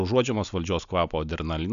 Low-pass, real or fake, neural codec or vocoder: 7.2 kHz; real; none